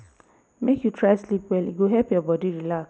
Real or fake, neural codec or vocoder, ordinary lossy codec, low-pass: real; none; none; none